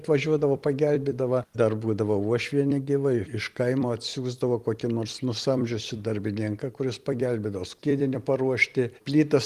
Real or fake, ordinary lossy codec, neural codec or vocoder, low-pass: fake; Opus, 24 kbps; vocoder, 44.1 kHz, 128 mel bands every 256 samples, BigVGAN v2; 14.4 kHz